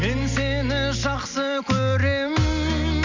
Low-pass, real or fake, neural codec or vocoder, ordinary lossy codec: 7.2 kHz; real; none; MP3, 64 kbps